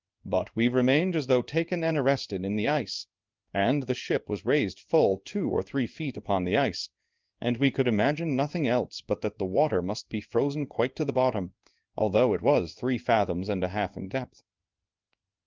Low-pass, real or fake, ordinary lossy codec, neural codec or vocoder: 7.2 kHz; fake; Opus, 32 kbps; codec, 16 kHz in and 24 kHz out, 1 kbps, XY-Tokenizer